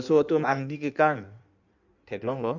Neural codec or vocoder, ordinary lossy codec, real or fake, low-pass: codec, 16 kHz in and 24 kHz out, 1.1 kbps, FireRedTTS-2 codec; none; fake; 7.2 kHz